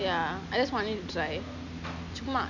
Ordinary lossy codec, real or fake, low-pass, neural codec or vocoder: none; real; 7.2 kHz; none